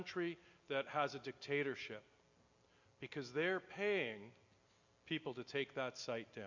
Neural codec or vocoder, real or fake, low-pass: none; real; 7.2 kHz